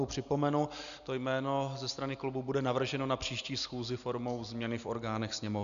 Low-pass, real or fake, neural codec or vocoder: 7.2 kHz; real; none